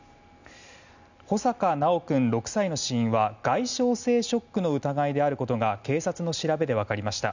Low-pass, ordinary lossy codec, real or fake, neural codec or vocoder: 7.2 kHz; none; real; none